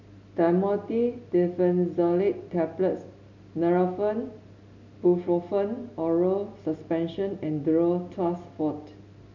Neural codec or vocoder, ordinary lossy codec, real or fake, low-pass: none; none; real; 7.2 kHz